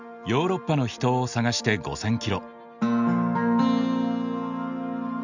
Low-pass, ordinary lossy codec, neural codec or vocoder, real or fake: 7.2 kHz; none; none; real